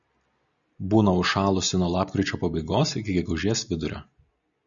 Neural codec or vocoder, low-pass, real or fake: none; 7.2 kHz; real